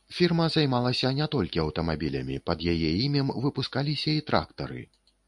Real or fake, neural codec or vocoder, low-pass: real; none; 10.8 kHz